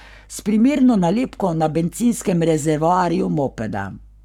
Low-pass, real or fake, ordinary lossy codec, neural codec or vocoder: 19.8 kHz; fake; none; codec, 44.1 kHz, 7.8 kbps, Pupu-Codec